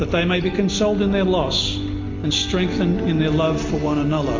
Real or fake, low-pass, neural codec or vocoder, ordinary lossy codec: real; 7.2 kHz; none; MP3, 48 kbps